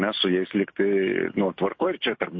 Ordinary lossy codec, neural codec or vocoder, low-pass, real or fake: MP3, 32 kbps; none; 7.2 kHz; real